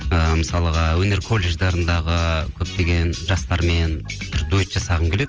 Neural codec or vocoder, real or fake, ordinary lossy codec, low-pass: none; real; Opus, 24 kbps; 7.2 kHz